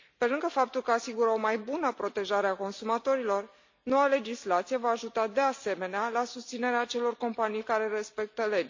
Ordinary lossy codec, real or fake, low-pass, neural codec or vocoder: MP3, 48 kbps; real; 7.2 kHz; none